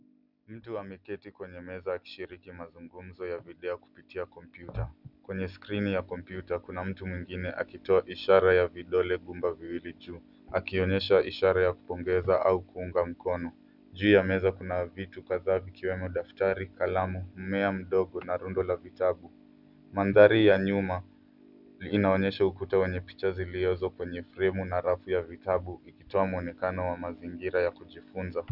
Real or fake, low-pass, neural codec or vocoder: real; 5.4 kHz; none